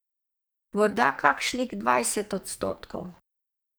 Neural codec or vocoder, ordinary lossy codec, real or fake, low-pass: codec, 44.1 kHz, 2.6 kbps, SNAC; none; fake; none